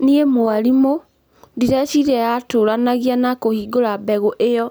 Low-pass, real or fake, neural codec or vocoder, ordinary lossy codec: none; real; none; none